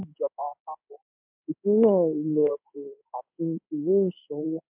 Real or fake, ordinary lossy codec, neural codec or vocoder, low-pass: fake; MP3, 32 kbps; codec, 16 kHz in and 24 kHz out, 1 kbps, XY-Tokenizer; 3.6 kHz